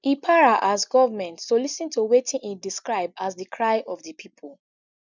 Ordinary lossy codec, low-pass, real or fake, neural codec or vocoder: none; 7.2 kHz; real; none